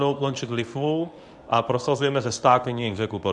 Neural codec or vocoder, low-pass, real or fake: codec, 24 kHz, 0.9 kbps, WavTokenizer, medium speech release version 1; 10.8 kHz; fake